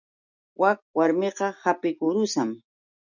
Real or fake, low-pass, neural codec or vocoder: real; 7.2 kHz; none